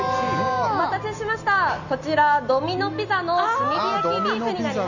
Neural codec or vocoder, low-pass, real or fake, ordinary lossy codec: none; 7.2 kHz; real; none